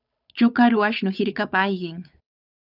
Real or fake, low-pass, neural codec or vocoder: fake; 5.4 kHz; codec, 16 kHz, 8 kbps, FunCodec, trained on Chinese and English, 25 frames a second